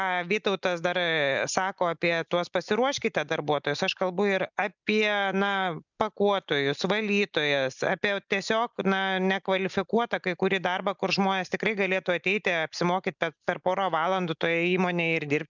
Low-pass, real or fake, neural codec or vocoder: 7.2 kHz; real; none